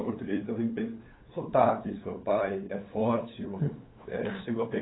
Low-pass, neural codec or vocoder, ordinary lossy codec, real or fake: 7.2 kHz; codec, 16 kHz, 8 kbps, FunCodec, trained on LibriTTS, 25 frames a second; AAC, 16 kbps; fake